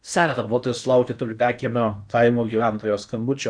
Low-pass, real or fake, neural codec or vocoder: 9.9 kHz; fake; codec, 16 kHz in and 24 kHz out, 0.8 kbps, FocalCodec, streaming, 65536 codes